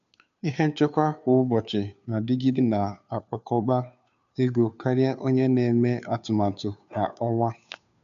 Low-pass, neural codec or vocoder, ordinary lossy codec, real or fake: 7.2 kHz; codec, 16 kHz, 2 kbps, FunCodec, trained on Chinese and English, 25 frames a second; none; fake